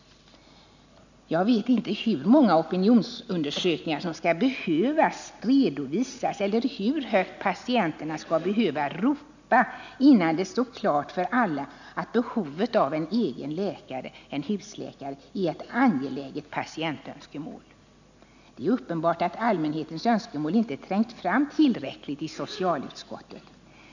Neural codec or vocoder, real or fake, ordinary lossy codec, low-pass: none; real; none; 7.2 kHz